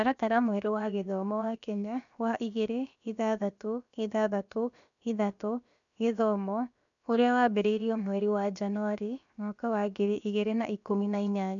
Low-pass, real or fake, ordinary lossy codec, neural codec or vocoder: 7.2 kHz; fake; none; codec, 16 kHz, about 1 kbps, DyCAST, with the encoder's durations